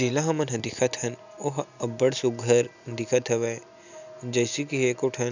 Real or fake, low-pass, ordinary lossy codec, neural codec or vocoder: real; 7.2 kHz; none; none